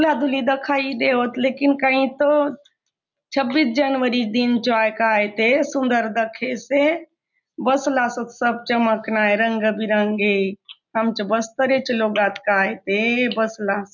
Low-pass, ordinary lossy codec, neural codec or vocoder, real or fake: 7.2 kHz; none; none; real